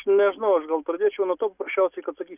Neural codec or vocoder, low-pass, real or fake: none; 3.6 kHz; real